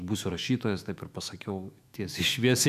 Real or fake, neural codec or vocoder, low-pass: fake; autoencoder, 48 kHz, 128 numbers a frame, DAC-VAE, trained on Japanese speech; 14.4 kHz